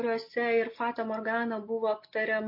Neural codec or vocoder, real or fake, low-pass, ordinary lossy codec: none; real; 5.4 kHz; MP3, 32 kbps